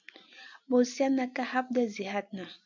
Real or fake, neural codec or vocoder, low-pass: real; none; 7.2 kHz